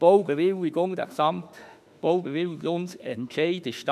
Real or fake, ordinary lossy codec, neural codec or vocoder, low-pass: fake; none; autoencoder, 48 kHz, 32 numbers a frame, DAC-VAE, trained on Japanese speech; 14.4 kHz